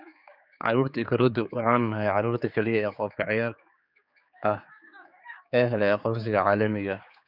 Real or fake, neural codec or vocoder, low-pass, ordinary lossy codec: fake; codec, 16 kHz, 4 kbps, X-Codec, HuBERT features, trained on general audio; 5.4 kHz; none